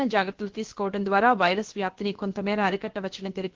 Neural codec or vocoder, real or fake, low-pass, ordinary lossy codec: codec, 16 kHz, about 1 kbps, DyCAST, with the encoder's durations; fake; 7.2 kHz; Opus, 16 kbps